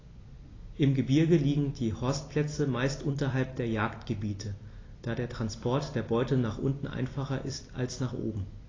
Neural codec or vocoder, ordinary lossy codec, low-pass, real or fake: none; AAC, 32 kbps; 7.2 kHz; real